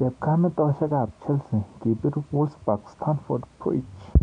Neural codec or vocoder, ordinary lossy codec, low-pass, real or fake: none; none; 9.9 kHz; real